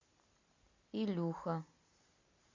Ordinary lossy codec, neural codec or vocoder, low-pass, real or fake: MP3, 48 kbps; none; 7.2 kHz; real